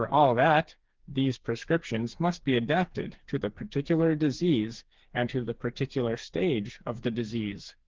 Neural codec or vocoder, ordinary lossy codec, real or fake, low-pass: codec, 16 kHz, 4 kbps, FreqCodec, smaller model; Opus, 16 kbps; fake; 7.2 kHz